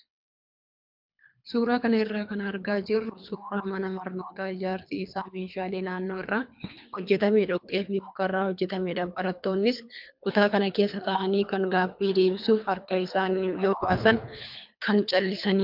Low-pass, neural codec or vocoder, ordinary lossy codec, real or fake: 5.4 kHz; codec, 24 kHz, 3 kbps, HILCodec; MP3, 48 kbps; fake